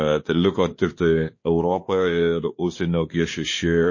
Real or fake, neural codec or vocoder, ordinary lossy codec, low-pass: fake; codec, 16 kHz, 2 kbps, X-Codec, WavLM features, trained on Multilingual LibriSpeech; MP3, 32 kbps; 7.2 kHz